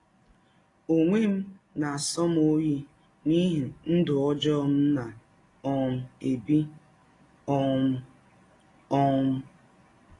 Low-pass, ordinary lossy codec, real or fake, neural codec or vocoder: 10.8 kHz; AAC, 32 kbps; real; none